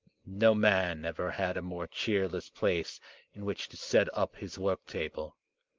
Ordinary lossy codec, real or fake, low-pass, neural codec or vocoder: Opus, 24 kbps; real; 7.2 kHz; none